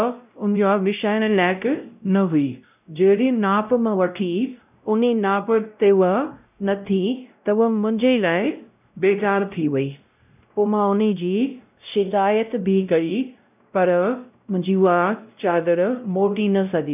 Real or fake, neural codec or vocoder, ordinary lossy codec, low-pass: fake; codec, 16 kHz, 0.5 kbps, X-Codec, WavLM features, trained on Multilingual LibriSpeech; none; 3.6 kHz